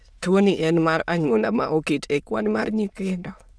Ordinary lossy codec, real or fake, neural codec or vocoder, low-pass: none; fake; autoencoder, 22.05 kHz, a latent of 192 numbers a frame, VITS, trained on many speakers; none